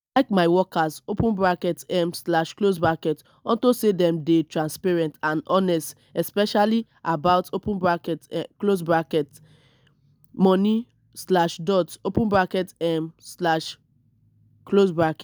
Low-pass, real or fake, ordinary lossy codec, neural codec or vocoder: none; real; none; none